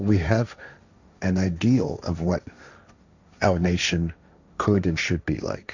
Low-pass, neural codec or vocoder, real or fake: 7.2 kHz; codec, 16 kHz, 1.1 kbps, Voila-Tokenizer; fake